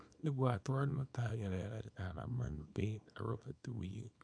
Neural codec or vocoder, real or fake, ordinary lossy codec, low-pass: codec, 24 kHz, 0.9 kbps, WavTokenizer, small release; fake; none; 9.9 kHz